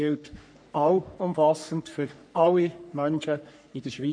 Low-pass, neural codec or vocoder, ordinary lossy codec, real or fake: 9.9 kHz; codec, 44.1 kHz, 3.4 kbps, Pupu-Codec; none; fake